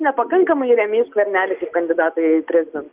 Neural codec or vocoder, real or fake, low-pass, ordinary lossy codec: none; real; 3.6 kHz; Opus, 32 kbps